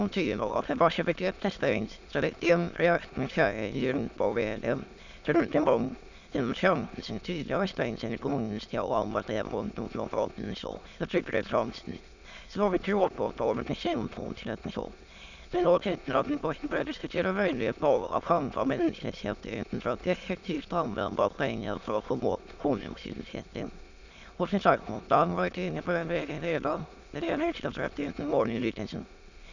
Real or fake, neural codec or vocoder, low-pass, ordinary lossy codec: fake; autoencoder, 22.05 kHz, a latent of 192 numbers a frame, VITS, trained on many speakers; 7.2 kHz; none